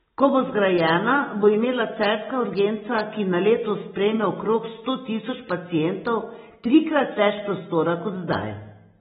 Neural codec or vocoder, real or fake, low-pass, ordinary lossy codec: none; real; 7.2 kHz; AAC, 16 kbps